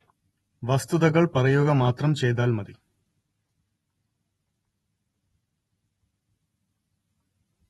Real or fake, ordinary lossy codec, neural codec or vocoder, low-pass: fake; AAC, 32 kbps; vocoder, 48 kHz, 128 mel bands, Vocos; 19.8 kHz